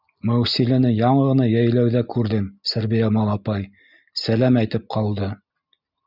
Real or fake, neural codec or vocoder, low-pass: real; none; 5.4 kHz